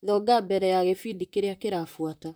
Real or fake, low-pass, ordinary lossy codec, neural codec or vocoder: fake; none; none; vocoder, 44.1 kHz, 128 mel bands, Pupu-Vocoder